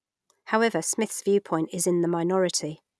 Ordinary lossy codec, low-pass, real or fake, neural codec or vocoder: none; none; real; none